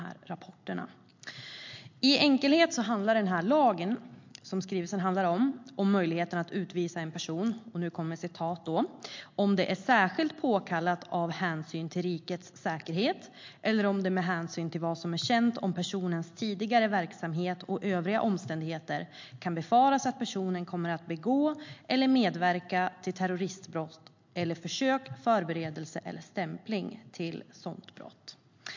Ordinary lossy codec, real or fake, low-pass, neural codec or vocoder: MP3, 48 kbps; real; 7.2 kHz; none